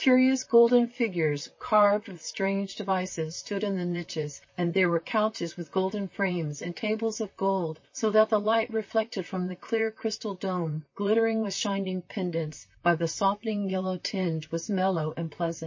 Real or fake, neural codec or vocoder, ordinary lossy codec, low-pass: fake; vocoder, 44.1 kHz, 128 mel bands, Pupu-Vocoder; MP3, 32 kbps; 7.2 kHz